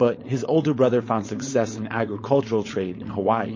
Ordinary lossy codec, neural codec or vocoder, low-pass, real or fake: MP3, 32 kbps; codec, 16 kHz, 4.8 kbps, FACodec; 7.2 kHz; fake